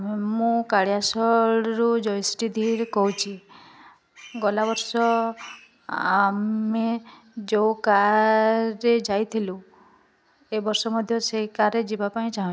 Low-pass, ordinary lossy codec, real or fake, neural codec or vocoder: none; none; real; none